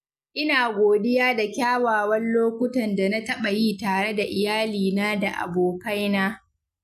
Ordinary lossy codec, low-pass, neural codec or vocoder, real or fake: none; 14.4 kHz; none; real